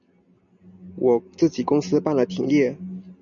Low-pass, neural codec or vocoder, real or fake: 7.2 kHz; none; real